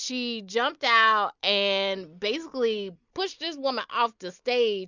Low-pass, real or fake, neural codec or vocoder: 7.2 kHz; real; none